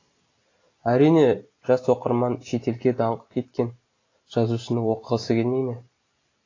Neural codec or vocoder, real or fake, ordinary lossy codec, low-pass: none; real; AAC, 32 kbps; 7.2 kHz